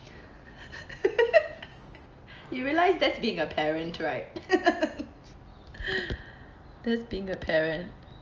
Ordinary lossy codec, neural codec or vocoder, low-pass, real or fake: Opus, 24 kbps; none; 7.2 kHz; real